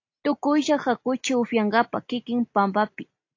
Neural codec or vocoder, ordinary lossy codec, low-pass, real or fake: none; AAC, 48 kbps; 7.2 kHz; real